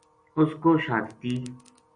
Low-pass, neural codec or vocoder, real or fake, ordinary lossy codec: 9.9 kHz; none; real; AAC, 64 kbps